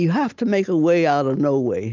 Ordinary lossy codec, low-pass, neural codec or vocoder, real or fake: Opus, 24 kbps; 7.2 kHz; none; real